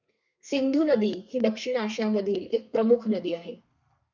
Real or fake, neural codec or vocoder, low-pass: fake; codec, 32 kHz, 1.9 kbps, SNAC; 7.2 kHz